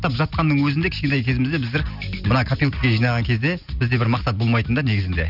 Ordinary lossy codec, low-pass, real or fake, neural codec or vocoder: none; 5.4 kHz; real; none